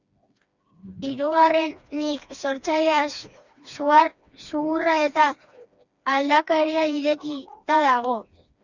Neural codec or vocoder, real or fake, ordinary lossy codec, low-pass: codec, 16 kHz, 2 kbps, FreqCodec, smaller model; fake; Opus, 64 kbps; 7.2 kHz